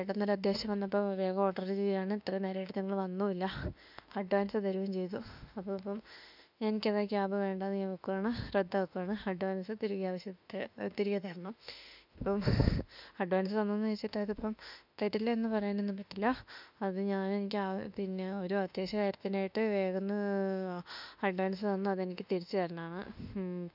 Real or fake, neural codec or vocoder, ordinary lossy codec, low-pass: fake; autoencoder, 48 kHz, 32 numbers a frame, DAC-VAE, trained on Japanese speech; none; 5.4 kHz